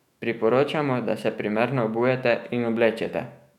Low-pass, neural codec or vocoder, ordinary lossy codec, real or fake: 19.8 kHz; autoencoder, 48 kHz, 128 numbers a frame, DAC-VAE, trained on Japanese speech; none; fake